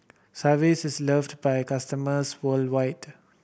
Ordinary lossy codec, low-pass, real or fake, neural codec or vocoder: none; none; real; none